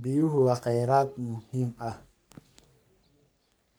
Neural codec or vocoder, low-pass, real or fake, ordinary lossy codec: codec, 44.1 kHz, 3.4 kbps, Pupu-Codec; none; fake; none